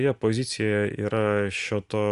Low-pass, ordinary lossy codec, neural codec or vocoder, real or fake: 10.8 kHz; Opus, 64 kbps; none; real